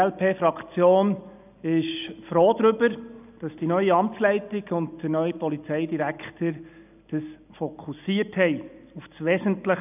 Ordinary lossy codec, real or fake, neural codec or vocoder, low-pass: none; real; none; 3.6 kHz